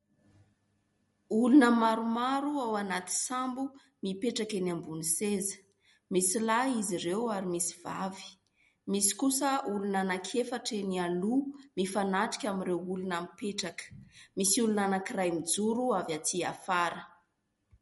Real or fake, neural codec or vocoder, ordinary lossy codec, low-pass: real; none; MP3, 48 kbps; 19.8 kHz